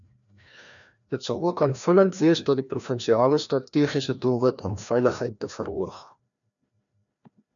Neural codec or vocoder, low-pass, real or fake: codec, 16 kHz, 1 kbps, FreqCodec, larger model; 7.2 kHz; fake